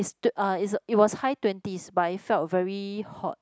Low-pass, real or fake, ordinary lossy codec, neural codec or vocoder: none; real; none; none